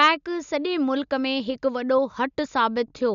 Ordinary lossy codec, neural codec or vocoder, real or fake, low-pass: none; none; real; 7.2 kHz